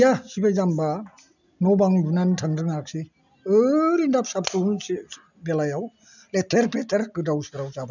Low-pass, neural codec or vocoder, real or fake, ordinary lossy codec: 7.2 kHz; none; real; none